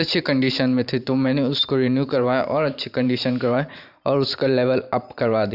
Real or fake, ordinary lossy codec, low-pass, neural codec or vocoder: fake; none; 5.4 kHz; vocoder, 44.1 kHz, 128 mel bands every 512 samples, BigVGAN v2